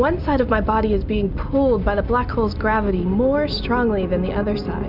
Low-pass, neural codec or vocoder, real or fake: 5.4 kHz; none; real